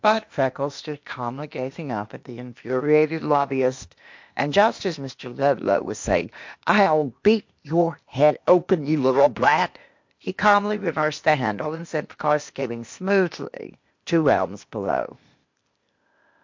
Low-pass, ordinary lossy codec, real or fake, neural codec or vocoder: 7.2 kHz; MP3, 48 kbps; fake; codec, 16 kHz, 0.8 kbps, ZipCodec